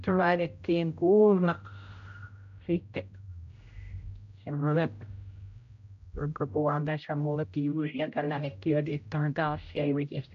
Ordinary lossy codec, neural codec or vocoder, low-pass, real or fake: AAC, 48 kbps; codec, 16 kHz, 0.5 kbps, X-Codec, HuBERT features, trained on general audio; 7.2 kHz; fake